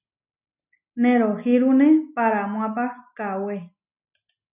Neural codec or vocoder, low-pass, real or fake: none; 3.6 kHz; real